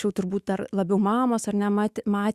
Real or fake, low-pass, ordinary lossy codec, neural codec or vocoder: fake; 14.4 kHz; Opus, 64 kbps; autoencoder, 48 kHz, 128 numbers a frame, DAC-VAE, trained on Japanese speech